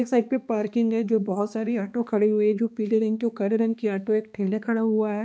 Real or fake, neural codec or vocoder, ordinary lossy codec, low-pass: fake; codec, 16 kHz, 2 kbps, X-Codec, HuBERT features, trained on balanced general audio; none; none